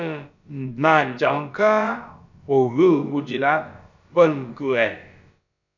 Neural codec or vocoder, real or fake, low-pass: codec, 16 kHz, about 1 kbps, DyCAST, with the encoder's durations; fake; 7.2 kHz